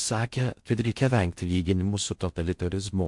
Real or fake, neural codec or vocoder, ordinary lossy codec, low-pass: fake; codec, 16 kHz in and 24 kHz out, 0.6 kbps, FocalCodec, streaming, 4096 codes; MP3, 64 kbps; 10.8 kHz